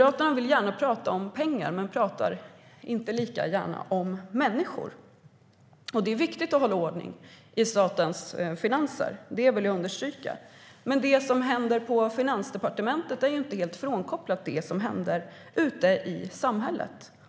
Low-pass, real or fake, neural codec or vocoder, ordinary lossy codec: none; real; none; none